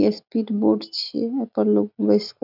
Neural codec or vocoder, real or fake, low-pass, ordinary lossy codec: none; real; 5.4 kHz; none